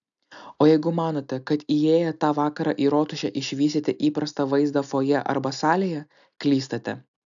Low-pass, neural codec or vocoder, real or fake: 7.2 kHz; none; real